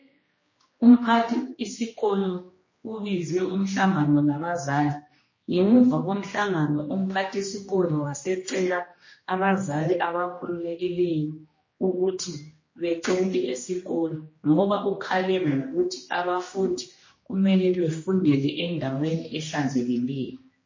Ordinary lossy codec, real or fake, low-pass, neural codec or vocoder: MP3, 32 kbps; fake; 7.2 kHz; codec, 16 kHz, 1 kbps, X-Codec, HuBERT features, trained on general audio